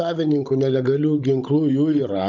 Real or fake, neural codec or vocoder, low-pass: fake; codec, 16 kHz, 16 kbps, FunCodec, trained on Chinese and English, 50 frames a second; 7.2 kHz